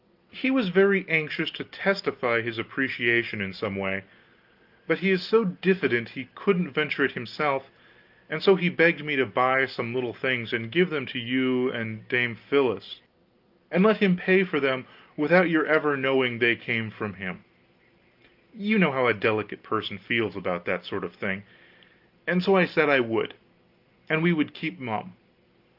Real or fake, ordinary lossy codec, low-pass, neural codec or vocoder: real; Opus, 32 kbps; 5.4 kHz; none